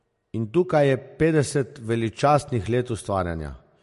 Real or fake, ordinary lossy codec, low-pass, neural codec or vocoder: real; MP3, 48 kbps; 14.4 kHz; none